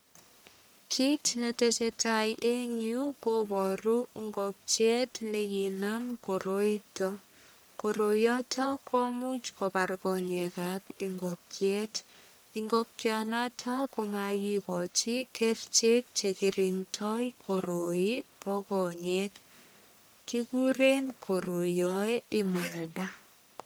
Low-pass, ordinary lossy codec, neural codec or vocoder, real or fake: none; none; codec, 44.1 kHz, 1.7 kbps, Pupu-Codec; fake